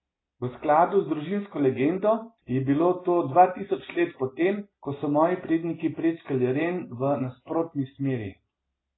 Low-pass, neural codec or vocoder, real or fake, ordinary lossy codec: 7.2 kHz; none; real; AAC, 16 kbps